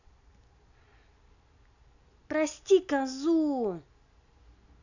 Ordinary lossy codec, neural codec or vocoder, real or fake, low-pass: none; none; real; 7.2 kHz